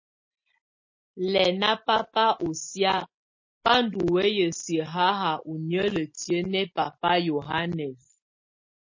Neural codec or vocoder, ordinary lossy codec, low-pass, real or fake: none; MP3, 32 kbps; 7.2 kHz; real